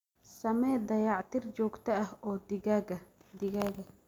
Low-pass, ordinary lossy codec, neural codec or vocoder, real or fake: 19.8 kHz; none; none; real